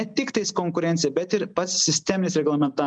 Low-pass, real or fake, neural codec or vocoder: 9.9 kHz; real; none